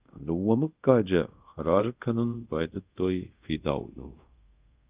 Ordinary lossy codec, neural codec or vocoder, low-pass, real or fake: Opus, 24 kbps; codec, 24 kHz, 0.5 kbps, DualCodec; 3.6 kHz; fake